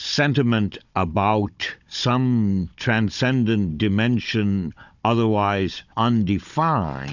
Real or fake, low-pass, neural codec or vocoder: fake; 7.2 kHz; codec, 16 kHz, 16 kbps, FunCodec, trained on Chinese and English, 50 frames a second